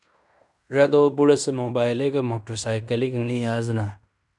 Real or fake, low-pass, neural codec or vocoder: fake; 10.8 kHz; codec, 16 kHz in and 24 kHz out, 0.9 kbps, LongCat-Audio-Codec, fine tuned four codebook decoder